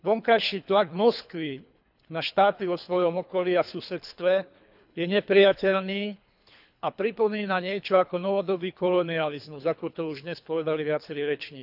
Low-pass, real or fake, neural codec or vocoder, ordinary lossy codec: 5.4 kHz; fake; codec, 24 kHz, 3 kbps, HILCodec; none